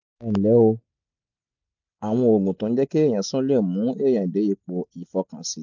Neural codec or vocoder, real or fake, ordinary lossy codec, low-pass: none; real; none; 7.2 kHz